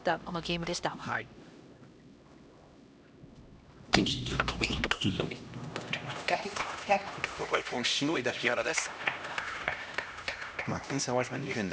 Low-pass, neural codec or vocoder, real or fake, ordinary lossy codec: none; codec, 16 kHz, 1 kbps, X-Codec, HuBERT features, trained on LibriSpeech; fake; none